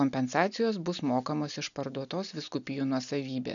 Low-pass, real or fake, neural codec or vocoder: 7.2 kHz; real; none